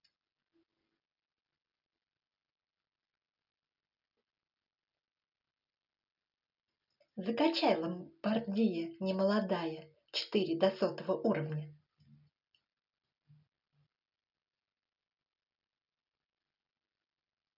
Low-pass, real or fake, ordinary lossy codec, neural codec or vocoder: 5.4 kHz; real; none; none